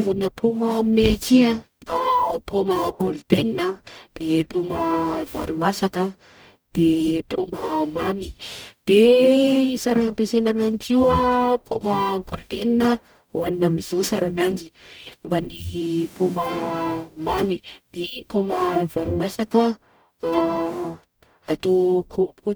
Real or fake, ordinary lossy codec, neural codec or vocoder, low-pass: fake; none; codec, 44.1 kHz, 0.9 kbps, DAC; none